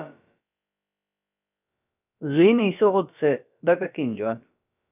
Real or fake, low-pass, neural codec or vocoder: fake; 3.6 kHz; codec, 16 kHz, about 1 kbps, DyCAST, with the encoder's durations